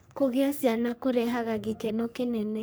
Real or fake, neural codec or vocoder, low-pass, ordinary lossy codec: fake; codec, 44.1 kHz, 3.4 kbps, Pupu-Codec; none; none